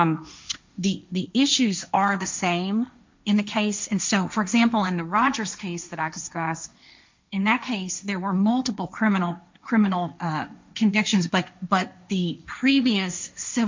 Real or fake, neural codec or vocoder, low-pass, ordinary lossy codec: fake; codec, 16 kHz, 1.1 kbps, Voila-Tokenizer; 7.2 kHz; MP3, 64 kbps